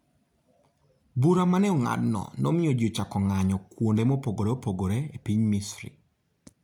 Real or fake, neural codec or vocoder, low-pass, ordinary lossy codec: real; none; 19.8 kHz; none